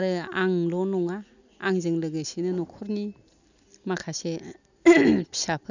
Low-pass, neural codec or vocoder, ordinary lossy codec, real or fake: 7.2 kHz; none; none; real